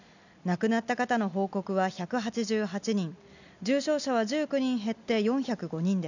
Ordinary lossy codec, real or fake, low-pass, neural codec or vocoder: none; real; 7.2 kHz; none